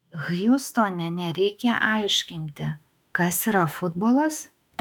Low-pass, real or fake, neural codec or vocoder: 19.8 kHz; fake; autoencoder, 48 kHz, 32 numbers a frame, DAC-VAE, trained on Japanese speech